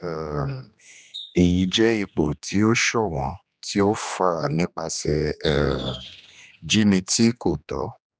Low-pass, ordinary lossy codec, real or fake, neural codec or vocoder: none; none; fake; codec, 16 kHz, 2 kbps, X-Codec, HuBERT features, trained on general audio